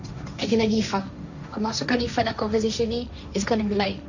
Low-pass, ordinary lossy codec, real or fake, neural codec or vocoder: 7.2 kHz; none; fake; codec, 16 kHz, 1.1 kbps, Voila-Tokenizer